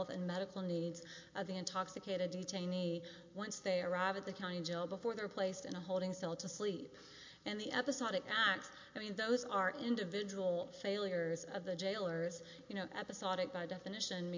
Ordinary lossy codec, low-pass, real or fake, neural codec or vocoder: MP3, 48 kbps; 7.2 kHz; real; none